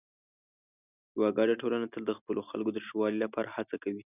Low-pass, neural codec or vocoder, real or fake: 3.6 kHz; none; real